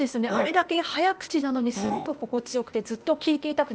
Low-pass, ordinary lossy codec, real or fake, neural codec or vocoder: none; none; fake; codec, 16 kHz, 0.8 kbps, ZipCodec